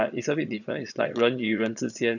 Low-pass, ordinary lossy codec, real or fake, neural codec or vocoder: 7.2 kHz; none; fake; vocoder, 22.05 kHz, 80 mel bands, Vocos